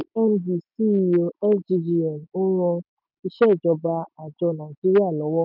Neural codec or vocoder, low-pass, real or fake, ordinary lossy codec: none; 5.4 kHz; real; none